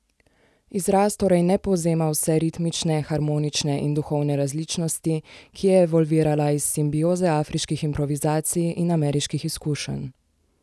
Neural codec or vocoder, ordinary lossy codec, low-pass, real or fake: none; none; none; real